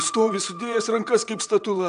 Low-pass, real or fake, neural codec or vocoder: 9.9 kHz; fake; vocoder, 22.05 kHz, 80 mel bands, WaveNeXt